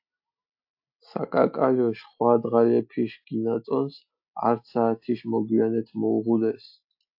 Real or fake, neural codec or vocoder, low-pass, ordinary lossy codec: real; none; 5.4 kHz; AAC, 48 kbps